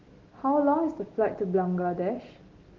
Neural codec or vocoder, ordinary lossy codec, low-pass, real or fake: none; Opus, 16 kbps; 7.2 kHz; real